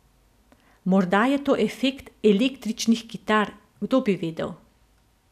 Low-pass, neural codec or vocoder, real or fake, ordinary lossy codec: 14.4 kHz; none; real; none